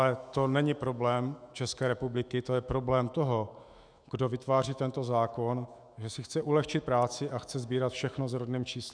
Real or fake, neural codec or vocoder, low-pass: fake; autoencoder, 48 kHz, 128 numbers a frame, DAC-VAE, trained on Japanese speech; 9.9 kHz